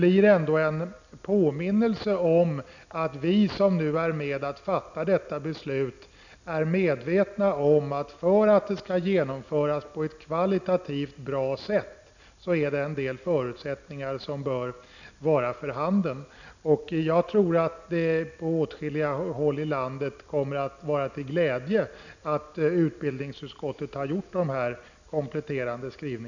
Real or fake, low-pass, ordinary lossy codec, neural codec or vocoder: real; 7.2 kHz; none; none